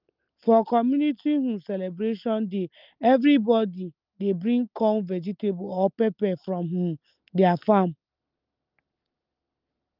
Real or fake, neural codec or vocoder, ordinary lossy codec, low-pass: real; none; Opus, 32 kbps; 5.4 kHz